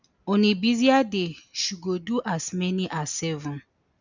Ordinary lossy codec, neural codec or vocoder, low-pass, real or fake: none; none; 7.2 kHz; real